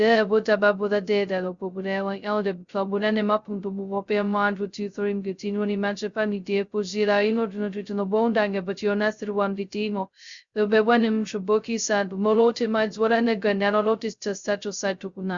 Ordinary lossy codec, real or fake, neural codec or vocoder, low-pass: Opus, 64 kbps; fake; codec, 16 kHz, 0.2 kbps, FocalCodec; 7.2 kHz